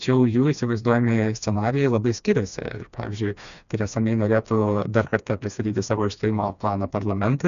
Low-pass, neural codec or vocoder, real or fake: 7.2 kHz; codec, 16 kHz, 2 kbps, FreqCodec, smaller model; fake